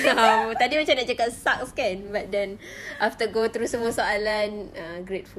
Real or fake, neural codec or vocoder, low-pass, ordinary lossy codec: fake; vocoder, 48 kHz, 128 mel bands, Vocos; 14.4 kHz; none